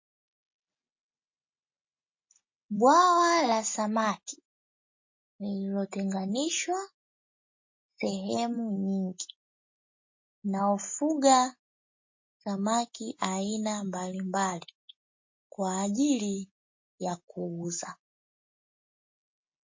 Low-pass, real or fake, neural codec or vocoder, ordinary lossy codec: 7.2 kHz; real; none; MP3, 32 kbps